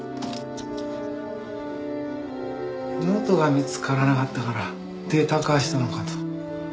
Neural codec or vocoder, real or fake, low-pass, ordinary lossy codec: none; real; none; none